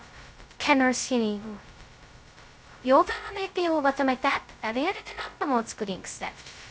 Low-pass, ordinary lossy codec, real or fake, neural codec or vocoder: none; none; fake; codec, 16 kHz, 0.2 kbps, FocalCodec